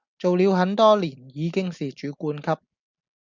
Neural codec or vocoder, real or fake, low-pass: none; real; 7.2 kHz